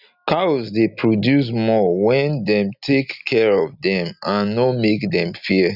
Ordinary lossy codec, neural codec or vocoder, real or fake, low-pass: none; none; real; 5.4 kHz